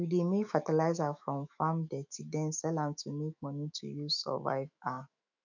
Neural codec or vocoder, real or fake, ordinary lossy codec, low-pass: autoencoder, 48 kHz, 128 numbers a frame, DAC-VAE, trained on Japanese speech; fake; none; 7.2 kHz